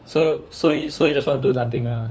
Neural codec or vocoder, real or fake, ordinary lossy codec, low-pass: codec, 16 kHz, 2 kbps, FunCodec, trained on LibriTTS, 25 frames a second; fake; none; none